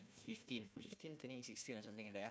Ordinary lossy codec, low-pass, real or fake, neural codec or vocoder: none; none; fake; codec, 16 kHz, 1 kbps, FunCodec, trained on Chinese and English, 50 frames a second